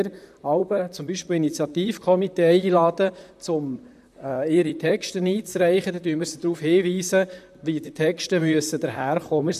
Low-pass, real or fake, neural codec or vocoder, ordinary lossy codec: 14.4 kHz; fake; vocoder, 44.1 kHz, 128 mel bands, Pupu-Vocoder; none